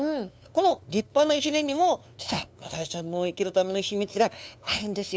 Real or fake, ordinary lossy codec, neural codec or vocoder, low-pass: fake; none; codec, 16 kHz, 2 kbps, FunCodec, trained on LibriTTS, 25 frames a second; none